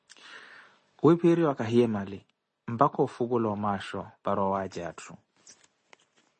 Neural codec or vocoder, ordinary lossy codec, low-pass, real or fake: none; MP3, 32 kbps; 10.8 kHz; real